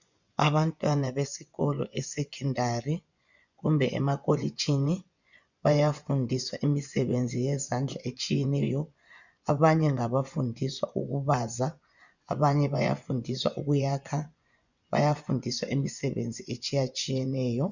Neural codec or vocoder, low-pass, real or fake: vocoder, 24 kHz, 100 mel bands, Vocos; 7.2 kHz; fake